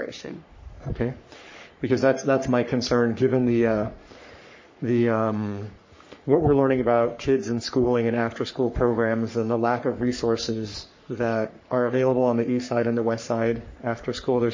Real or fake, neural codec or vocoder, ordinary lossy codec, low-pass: fake; codec, 44.1 kHz, 3.4 kbps, Pupu-Codec; MP3, 32 kbps; 7.2 kHz